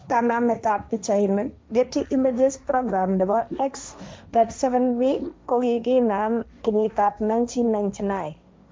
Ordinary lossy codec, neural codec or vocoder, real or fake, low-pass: none; codec, 16 kHz, 1.1 kbps, Voila-Tokenizer; fake; none